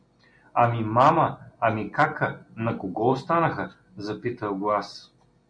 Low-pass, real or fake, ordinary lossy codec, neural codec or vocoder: 9.9 kHz; real; MP3, 64 kbps; none